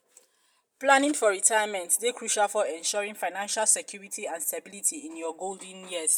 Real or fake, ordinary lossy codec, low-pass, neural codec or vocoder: real; none; none; none